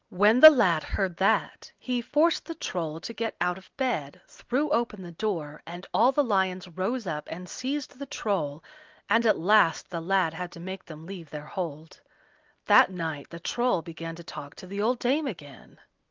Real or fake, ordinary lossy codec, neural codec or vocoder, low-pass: real; Opus, 24 kbps; none; 7.2 kHz